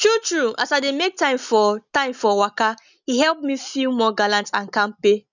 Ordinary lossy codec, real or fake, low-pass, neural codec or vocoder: none; real; 7.2 kHz; none